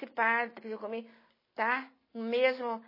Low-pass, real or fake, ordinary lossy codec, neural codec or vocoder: 5.4 kHz; real; MP3, 24 kbps; none